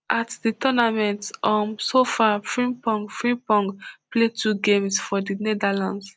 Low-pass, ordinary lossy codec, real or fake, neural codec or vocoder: none; none; real; none